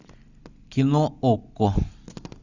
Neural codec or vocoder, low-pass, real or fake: none; 7.2 kHz; real